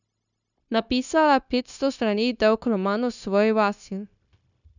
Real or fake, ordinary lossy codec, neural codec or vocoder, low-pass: fake; none; codec, 16 kHz, 0.9 kbps, LongCat-Audio-Codec; 7.2 kHz